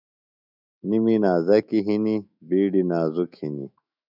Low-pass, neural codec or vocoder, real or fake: 5.4 kHz; autoencoder, 48 kHz, 128 numbers a frame, DAC-VAE, trained on Japanese speech; fake